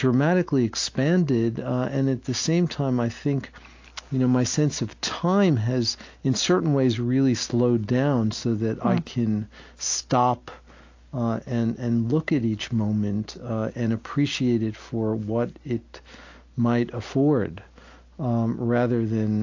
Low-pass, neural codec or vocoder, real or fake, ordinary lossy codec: 7.2 kHz; none; real; AAC, 48 kbps